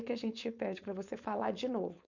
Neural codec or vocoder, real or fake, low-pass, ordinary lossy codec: codec, 16 kHz, 4.8 kbps, FACodec; fake; 7.2 kHz; none